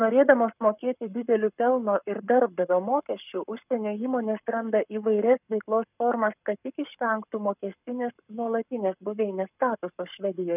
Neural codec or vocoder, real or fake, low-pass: codec, 44.1 kHz, 7.8 kbps, Pupu-Codec; fake; 3.6 kHz